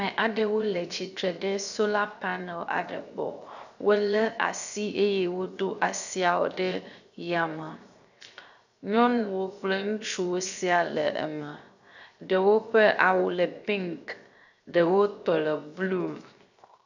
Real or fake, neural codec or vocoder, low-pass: fake; codec, 16 kHz, 0.7 kbps, FocalCodec; 7.2 kHz